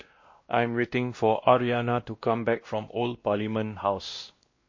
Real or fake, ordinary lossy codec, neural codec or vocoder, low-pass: fake; MP3, 32 kbps; codec, 16 kHz, 1 kbps, X-Codec, WavLM features, trained on Multilingual LibriSpeech; 7.2 kHz